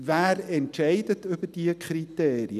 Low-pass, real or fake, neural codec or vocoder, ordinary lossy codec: 14.4 kHz; real; none; none